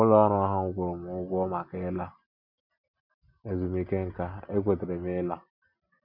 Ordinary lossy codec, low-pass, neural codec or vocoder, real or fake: MP3, 48 kbps; 5.4 kHz; none; real